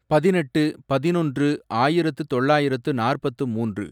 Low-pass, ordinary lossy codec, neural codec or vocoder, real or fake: 19.8 kHz; none; none; real